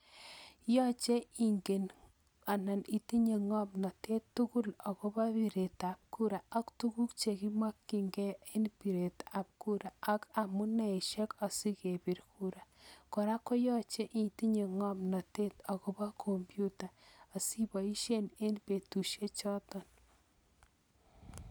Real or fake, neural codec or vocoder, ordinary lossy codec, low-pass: real; none; none; none